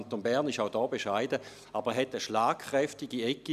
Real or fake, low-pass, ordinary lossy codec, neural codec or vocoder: real; 14.4 kHz; none; none